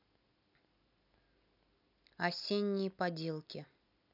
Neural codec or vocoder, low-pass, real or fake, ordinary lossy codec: none; 5.4 kHz; real; none